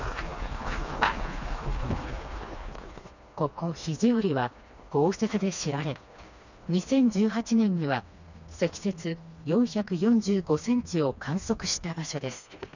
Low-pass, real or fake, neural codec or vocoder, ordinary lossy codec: 7.2 kHz; fake; codec, 16 kHz, 2 kbps, FreqCodec, smaller model; none